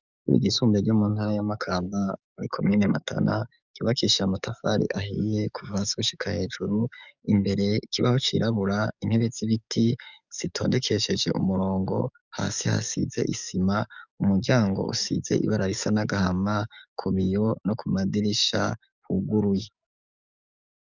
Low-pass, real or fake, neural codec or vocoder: 7.2 kHz; fake; codec, 44.1 kHz, 7.8 kbps, DAC